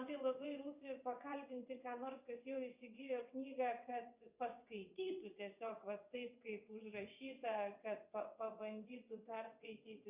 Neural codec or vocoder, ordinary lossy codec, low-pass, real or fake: vocoder, 22.05 kHz, 80 mel bands, WaveNeXt; Opus, 64 kbps; 3.6 kHz; fake